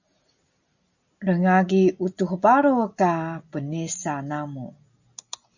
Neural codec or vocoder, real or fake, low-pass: none; real; 7.2 kHz